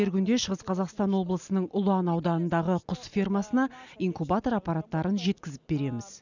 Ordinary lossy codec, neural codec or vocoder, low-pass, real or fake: none; none; 7.2 kHz; real